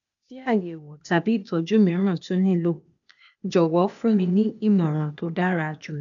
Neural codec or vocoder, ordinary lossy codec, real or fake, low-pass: codec, 16 kHz, 0.8 kbps, ZipCodec; none; fake; 7.2 kHz